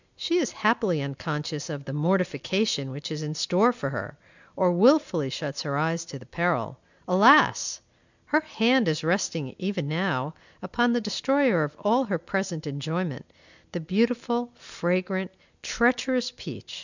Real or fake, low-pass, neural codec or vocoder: real; 7.2 kHz; none